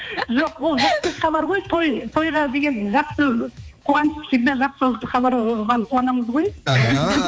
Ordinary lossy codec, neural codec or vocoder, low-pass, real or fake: none; codec, 16 kHz, 4 kbps, X-Codec, HuBERT features, trained on balanced general audio; none; fake